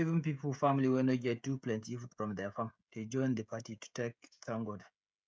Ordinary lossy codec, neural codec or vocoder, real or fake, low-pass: none; codec, 16 kHz, 16 kbps, FreqCodec, smaller model; fake; none